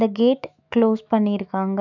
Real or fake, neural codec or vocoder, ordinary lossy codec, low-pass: fake; autoencoder, 48 kHz, 128 numbers a frame, DAC-VAE, trained on Japanese speech; none; 7.2 kHz